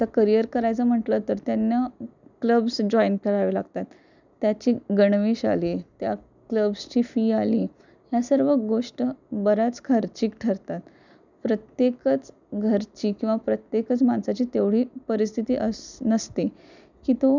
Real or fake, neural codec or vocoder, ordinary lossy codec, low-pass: real; none; none; 7.2 kHz